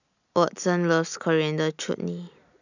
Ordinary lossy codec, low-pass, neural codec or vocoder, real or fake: none; 7.2 kHz; none; real